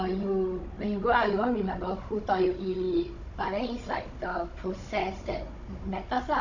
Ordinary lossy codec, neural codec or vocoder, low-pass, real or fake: none; codec, 16 kHz, 16 kbps, FunCodec, trained on Chinese and English, 50 frames a second; 7.2 kHz; fake